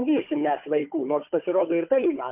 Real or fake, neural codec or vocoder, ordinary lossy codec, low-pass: fake; codec, 16 kHz, 4 kbps, FunCodec, trained on Chinese and English, 50 frames a second; MP3, 32 kbps; 3.6 kHz